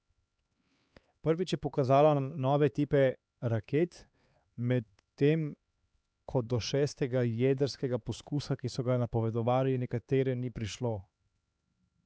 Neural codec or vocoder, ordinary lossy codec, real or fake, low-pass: codec, 16 kHz, 4 kbps, X-Codec, HuBERT features, trained on LibriSpeech; none; fake; none